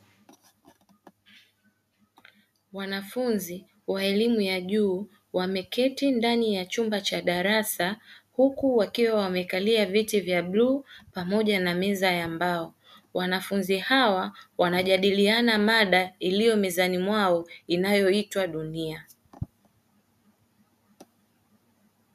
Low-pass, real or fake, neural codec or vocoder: 14.4 kHz; real; none